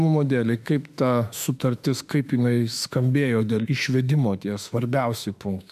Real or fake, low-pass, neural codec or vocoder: fake; 14.4 kHz; autoencoder, 48 kHz, 32 numbers a frame, DAC-VAE, trained on Japanese speech